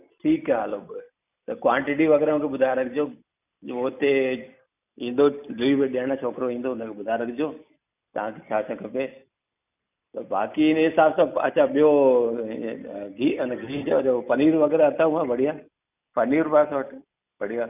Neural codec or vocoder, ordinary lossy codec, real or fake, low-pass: none; none; real; 3.6 kHz